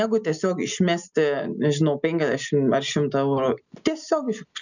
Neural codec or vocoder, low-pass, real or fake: none; 7.2 kHz; real